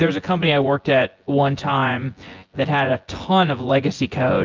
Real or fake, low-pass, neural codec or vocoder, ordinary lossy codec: fake; 7.2 kHz; vocoder, 24 kHz, 100 mel bands, Vocos; Opus, 32 kbps